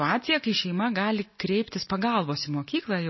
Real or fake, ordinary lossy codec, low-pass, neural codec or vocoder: real; MP3, 24 kbps; 7.2 kHz; none